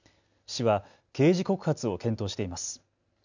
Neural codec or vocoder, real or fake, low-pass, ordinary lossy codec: none; real; 7.2 kHz; none